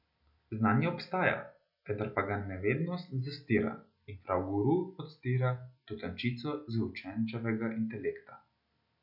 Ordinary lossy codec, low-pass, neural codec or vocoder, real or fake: none; 5.4 kHz; none; real